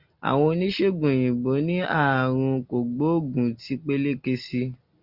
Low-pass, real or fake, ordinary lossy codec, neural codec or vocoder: 5.4 kHz; real; Opus, 64 kbps; none